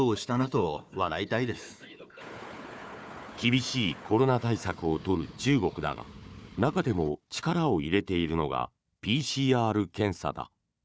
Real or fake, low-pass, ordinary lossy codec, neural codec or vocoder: fake; none; none; codec, 16 kHz, 4 kbps, FunCodec, trained on Chinese and English, 50 frames a second